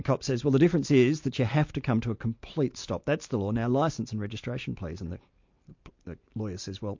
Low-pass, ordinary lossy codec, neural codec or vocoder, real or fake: 7.2 kHz; MP3, 48 kbps; none; real